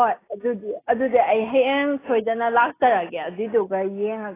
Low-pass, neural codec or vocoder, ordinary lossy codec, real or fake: 3.6 kHz; none; AAC, 16 kbps; real